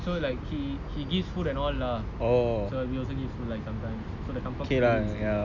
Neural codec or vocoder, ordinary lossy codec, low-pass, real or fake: none; none; 7.2 kHz; real